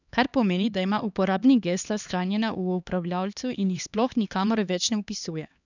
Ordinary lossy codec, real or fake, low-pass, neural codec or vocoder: none; fake; 7.2 kHz; codec, 16 kHz, 2 kbps, X-Codec, HuBERT features, trained on LibriSpeech